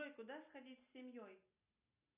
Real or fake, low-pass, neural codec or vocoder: real; 3.6 kHz; none